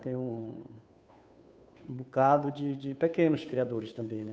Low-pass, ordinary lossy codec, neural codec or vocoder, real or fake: none; none; codec, 16 kHz, 2 kbps, FunCodec, trained on Chinese and English, 25 frames a second; fake